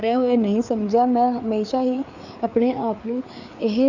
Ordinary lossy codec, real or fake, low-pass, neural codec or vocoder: none; fake; 7.2 kHz; codec, 16 kHz, 4 kbps, FreqCodec, larger model